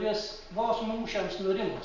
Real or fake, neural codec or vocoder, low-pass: real; none; 7.2 kHz